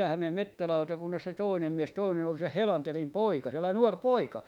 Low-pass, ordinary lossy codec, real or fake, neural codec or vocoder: 19.8 kHz; none; fake; autoencoder, 48 kHz, 32 numbers a frame, DAC-VAE, trained on Japanese speech